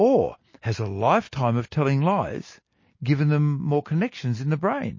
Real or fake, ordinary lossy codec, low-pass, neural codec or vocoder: real; MP3, 32 kbps; 7.2 kHz; none